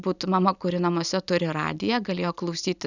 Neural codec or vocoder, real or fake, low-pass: none; real; 7.2 kHz